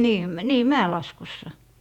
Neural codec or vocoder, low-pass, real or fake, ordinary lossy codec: vocoder, 48 kHz, 128 mel bands, Vocos; 19.8 kHz; fake; none